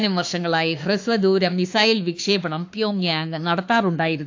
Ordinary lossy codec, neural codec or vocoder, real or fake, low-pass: AAC, 48 kbps; autoencoder, 48 kHz, 32 numbers a frame, DAC-VAE, trained on Japanese speech; fake; 7.2 kHz